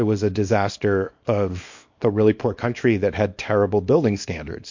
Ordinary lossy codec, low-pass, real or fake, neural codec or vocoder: MP3, 48 kbps; 7.2 kHz; fake; codec, 24 kHz, 0.9 kbps, WavTokenizer, small release